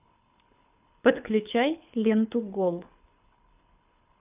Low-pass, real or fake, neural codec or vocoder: 3.6 kHz; fake; codec, 24 kHz, 6 kbps, HILCodec